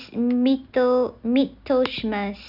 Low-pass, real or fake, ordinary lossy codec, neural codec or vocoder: 5.4 kHz; real; none; none